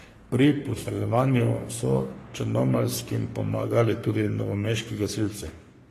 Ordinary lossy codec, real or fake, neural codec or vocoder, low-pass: AAC, 48 kbps; fake; codec, 44.1 kHz, 3.4 kbps, Pupu-Codec; 14.4 kHz